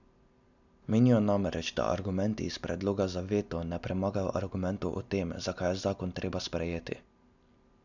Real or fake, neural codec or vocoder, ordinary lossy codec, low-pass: real; none; none; 7.2 kHz